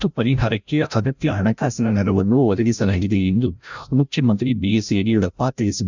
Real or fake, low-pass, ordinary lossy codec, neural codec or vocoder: fake; 7.2 kHz; none; codec, 16 kHz, 0.5 kbps, FreqCodec, larger model